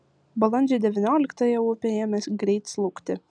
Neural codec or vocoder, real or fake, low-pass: none; real; 9.9 kHz